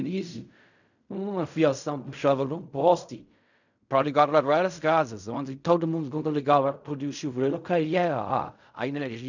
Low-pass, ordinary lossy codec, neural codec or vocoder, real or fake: 7.2 kHz; none; codec, 16 kHz in and 24 kHz out, 0.4 kbps, LongCat-Audio-Codec, fine tuned four codebook decoder; fake